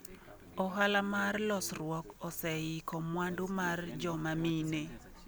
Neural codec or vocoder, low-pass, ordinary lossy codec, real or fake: none; none; none; real